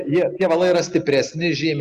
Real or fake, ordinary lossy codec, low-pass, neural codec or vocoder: real; Opus, 64 kbps; 14.4 kHz; none